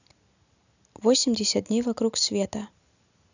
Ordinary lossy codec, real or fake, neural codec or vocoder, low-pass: none; real; none; 7.2 kHz